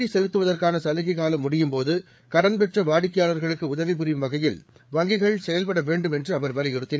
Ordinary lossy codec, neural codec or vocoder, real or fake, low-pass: none; codec, 16 kHz, 4 kbps, FreqCodec, larger model; fake; none